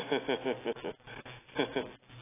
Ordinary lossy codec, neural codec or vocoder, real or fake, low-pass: AAC, 16 kbps; none; real; 3.6 kHz